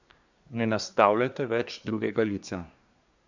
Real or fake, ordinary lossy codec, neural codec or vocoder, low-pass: fake; none; codec, 24 kHz, 1 kbps, SNAC; 7.2 kHz